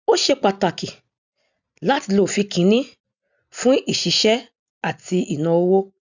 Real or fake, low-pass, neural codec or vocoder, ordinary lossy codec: real; 7.2 kHz; none; none